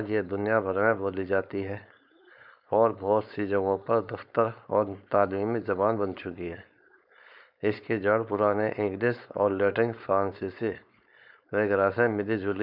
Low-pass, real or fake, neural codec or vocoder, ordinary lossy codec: 5.4 kHz; fake; codec, 16 kHz, 4.8 kbps, FACodec; none